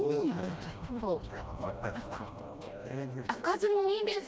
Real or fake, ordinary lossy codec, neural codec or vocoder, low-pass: fake; none; codec, 16 kHz, 1 kbps, FreqCodec, smaller model; none